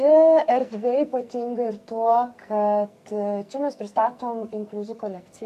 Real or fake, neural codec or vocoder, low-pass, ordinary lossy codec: fake; codec, 44.1 kHz, 2.6 kbps, SNAC; 14.4 kHz; MP3, 96 kbps